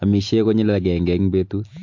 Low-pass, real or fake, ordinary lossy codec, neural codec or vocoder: 7.2 kHz; real; MP3, 48 kbps; none